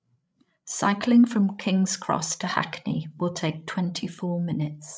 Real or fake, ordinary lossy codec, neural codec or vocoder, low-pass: fake; none; codec, 16 kHz, 16 kbps, FreqCodec, larger model; none